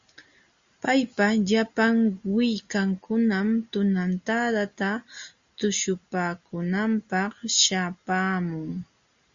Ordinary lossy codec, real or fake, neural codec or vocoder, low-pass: Opus, 64 kbps; real; none; 7.2 kHz